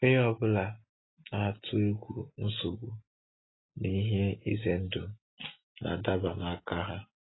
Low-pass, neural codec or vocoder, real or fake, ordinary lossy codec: 7.2 kHz; none; real; AAC, 16 kbps